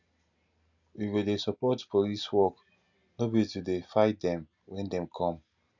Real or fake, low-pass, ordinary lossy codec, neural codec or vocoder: real; 7.2 kHz; none; none